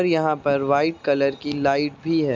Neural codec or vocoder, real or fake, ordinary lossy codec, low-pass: none; real; none; none